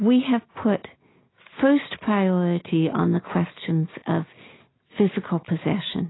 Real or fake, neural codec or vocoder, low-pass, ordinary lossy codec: real; none; 7.2 kHz; AAC, 16 kbps